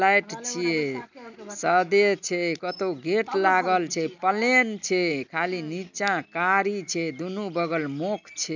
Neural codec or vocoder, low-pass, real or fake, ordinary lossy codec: none; 7.2 kHz; real; none